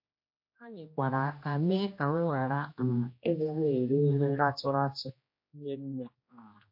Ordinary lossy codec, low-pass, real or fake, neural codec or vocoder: MP3, 32 kbps; 5.4 kHz; fake; codec, 16 kHz, 1 kbps, X-Codec, HuBERT features, trained on general audio